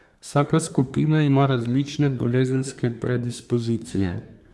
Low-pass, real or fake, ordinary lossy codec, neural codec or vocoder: none; fake; none; codec, 24 kHz, 1 kbps, SNAC